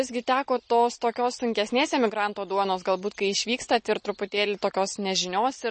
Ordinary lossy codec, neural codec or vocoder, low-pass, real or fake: MP3, 32 kbps; none; 10.8 kHz; real